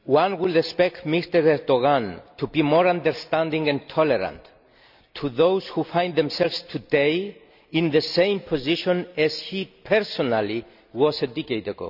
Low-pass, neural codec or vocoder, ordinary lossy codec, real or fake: 5.4 kHz; none; none; real